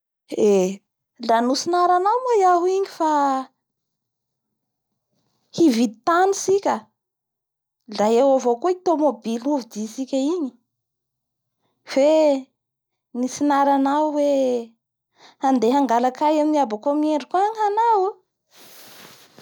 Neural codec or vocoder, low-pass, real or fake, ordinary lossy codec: none; none; real; none